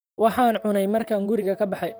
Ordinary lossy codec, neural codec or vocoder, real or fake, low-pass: none; vocoder, 44.1 kHz, 128 mel bands, Pupu-Vocoder; fake; none